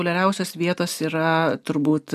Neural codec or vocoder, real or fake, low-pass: none; real; 14.4 kHz